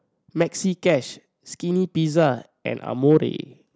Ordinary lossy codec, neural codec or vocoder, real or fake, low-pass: none; none; real; none